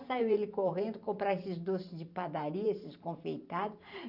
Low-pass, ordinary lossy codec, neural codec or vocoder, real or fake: 5.4 kHz; none; vocoder, 44.1 kHz, 128 mel bands every 512 samples, BigVGAN v2; fake